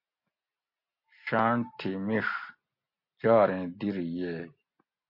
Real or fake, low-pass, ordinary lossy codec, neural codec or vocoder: real; 5.4 kHz; MP3, 32 kbps; none